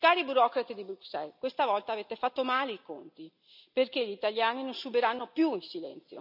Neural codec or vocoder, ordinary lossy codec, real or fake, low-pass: none; none; real; 5.4 kHz